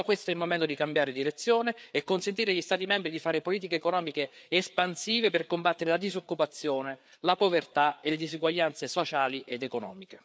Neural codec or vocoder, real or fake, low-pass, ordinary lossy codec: codec, 16 kHz, 4 kbps, FreqCodec, larger model; fake; none; none